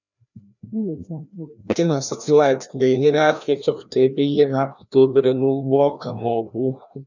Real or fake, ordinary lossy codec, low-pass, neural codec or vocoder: fake; none; 7.2 kHz; codec, 16 kHz, 1 kbps, FreqCodec, larger model